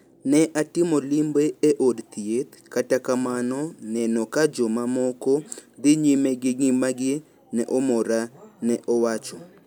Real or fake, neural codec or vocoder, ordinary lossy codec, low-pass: real; none; none; none